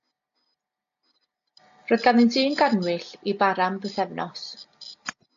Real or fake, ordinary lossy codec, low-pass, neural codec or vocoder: real; AAC, 48 kbps; 7.2 kHz; none